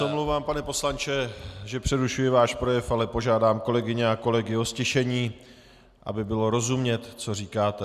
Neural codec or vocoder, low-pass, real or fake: none; 14.4 kHz; real